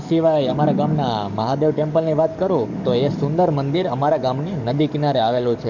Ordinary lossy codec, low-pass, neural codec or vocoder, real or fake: none; 7.2 kHz; codec, 44.1 kHz, 7.8 kbps, DAC; fake